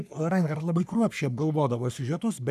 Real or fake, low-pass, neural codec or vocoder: fake; 14.4 kHz; codec, 44.1 kHz, 3.4 kbps, Pupu-Codec